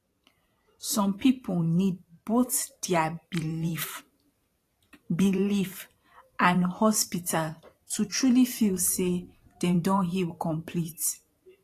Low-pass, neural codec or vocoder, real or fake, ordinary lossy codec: 14.4 kHz; vocoder, 44.1 kHz, 128 mel bands every 512 samples, BigVGAN v2; fake; AAC, 48 kbps